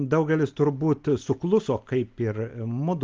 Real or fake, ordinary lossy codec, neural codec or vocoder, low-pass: real; Opus, 32 kbps; none; 7.2 kHz